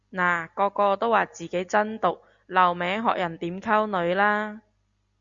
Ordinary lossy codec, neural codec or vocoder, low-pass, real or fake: Opus, 64 kbps; none; 7.2 kHz; real